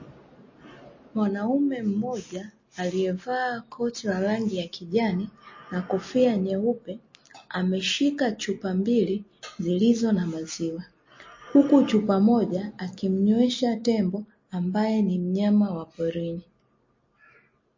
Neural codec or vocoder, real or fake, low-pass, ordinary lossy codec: none; real; 7.2 kHz; MP3, 32 kbps